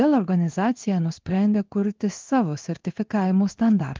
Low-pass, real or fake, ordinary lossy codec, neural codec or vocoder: 7.2 kHz; fake; Opus, 32 kbps; codec, 16 kHz in and 24 kHz out, 1 kbps, XY-Tokenizer